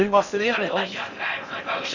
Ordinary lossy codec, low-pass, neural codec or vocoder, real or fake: none; 7.2 kHz; codec, 16 kHz in and 24 kHz out, 0.6 kbps, FocalCodec, streaming, 4096 codes; fake